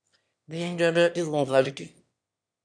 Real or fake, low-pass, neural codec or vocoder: fake; 9.9 kHz; autoencoder, 22.05 kHz, a latent of 192 numbers a frame, VITS, trained on one speaker